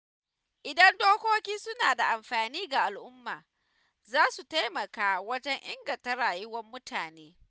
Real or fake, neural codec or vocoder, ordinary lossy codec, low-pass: real; none; none; none